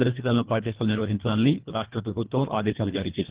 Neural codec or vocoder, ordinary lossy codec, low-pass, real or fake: codec, 24 kHz, 1.5 kbps, HILCodec; Opus, 16 kbps; 3.6 kHz; fake